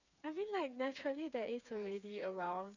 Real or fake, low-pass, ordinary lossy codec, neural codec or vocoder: fake; 7.2 kHz; none; codec, 16 kHz, 4 kbps, FreqCodec, smaller model